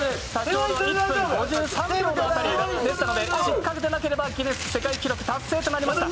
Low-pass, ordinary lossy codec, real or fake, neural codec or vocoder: none; none; real; none